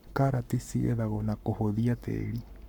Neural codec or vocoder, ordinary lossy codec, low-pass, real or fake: codec, 44.1 kHz, 7.8 kbps, Pupu-Codec; none; 19.8 kHz; fake